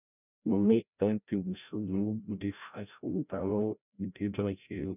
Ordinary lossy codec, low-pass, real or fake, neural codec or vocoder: none; 3.6 kHz; fake; codec, 16 kHz, 0.5 kbps, FreqCodec, larger model